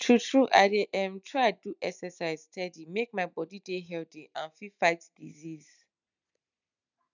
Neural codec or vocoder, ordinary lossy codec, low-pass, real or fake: none; none; 7.2 kHz; real